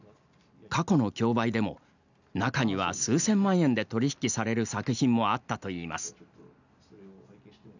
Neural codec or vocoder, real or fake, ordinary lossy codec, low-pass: none; real; none; 7.2 kHz